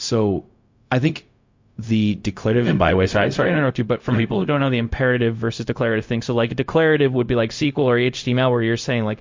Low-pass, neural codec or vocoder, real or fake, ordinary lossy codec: 7.2 kHz; codec, 16 kHz, 0.4 kbps, LongCat-Audio-Codec; fake; MP3, 48 kbps